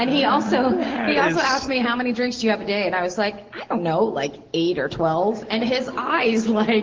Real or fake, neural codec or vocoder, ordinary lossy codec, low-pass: real; none; Opus, 16 kbps; 7.2 kHz